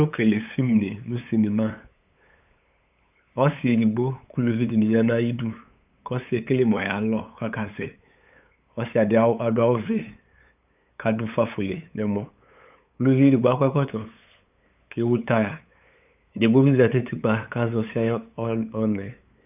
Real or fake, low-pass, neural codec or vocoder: fake; 3.6 kHz; codec, 16 kHz, 8 kbps, FunCodec, trained on LibriTTS, 25 frames a second